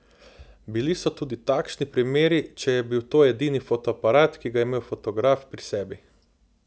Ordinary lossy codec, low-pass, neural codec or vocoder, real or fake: none; none; none; real